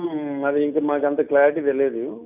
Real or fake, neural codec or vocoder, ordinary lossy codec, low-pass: real; none; none; 3.6 kHz